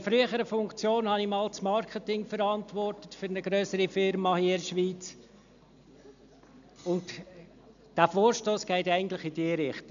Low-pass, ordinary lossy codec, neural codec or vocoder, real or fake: 7.2 kHz; none; none; real